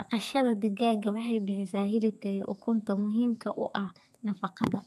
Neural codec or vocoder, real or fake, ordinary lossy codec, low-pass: codec, 32 kHz, 1.9 kbps, SNAC; fake; none; 14.4 kHz